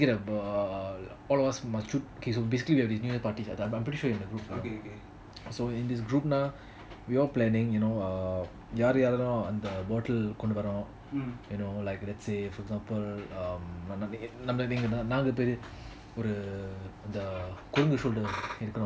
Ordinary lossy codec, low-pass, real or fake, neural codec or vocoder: none; none; real; none